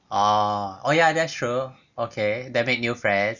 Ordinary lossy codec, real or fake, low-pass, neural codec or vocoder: none; real; 7.2 kHz; none